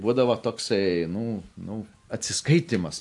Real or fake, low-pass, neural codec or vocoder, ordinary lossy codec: real; 10.8 kHz; none; AAC, 64 kbps